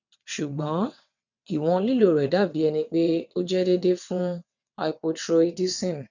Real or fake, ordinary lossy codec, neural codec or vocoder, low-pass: fake; none; vocoder, 22.05 kHz, 80 mel bands, WaveNeXt; 7.2 kHz